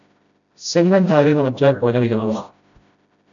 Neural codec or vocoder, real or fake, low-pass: codec, 16 kHz, 0.5 kbps, FreqCodec, smaller model; fake; 7.2 kHz